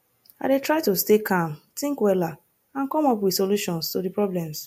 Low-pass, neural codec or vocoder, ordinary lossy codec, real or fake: 19.8 kHz; none; MP3, 64 kbps; real